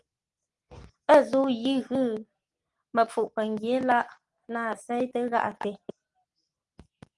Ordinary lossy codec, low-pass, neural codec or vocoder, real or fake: Opus, 24 kbps; 10.8 kHz; none; real